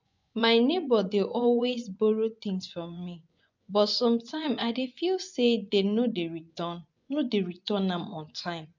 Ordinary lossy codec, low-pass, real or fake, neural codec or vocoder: MP3, 64 kbps; 7.2 kHz; fake; vocoder, 24 kHz, 100 mel bands, Vocos